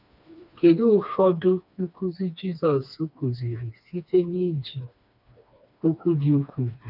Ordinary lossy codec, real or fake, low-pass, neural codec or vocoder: none; fake; 5.4 kHz; codec, 16 kHz, 2 kbps, FreqCodec, smaller model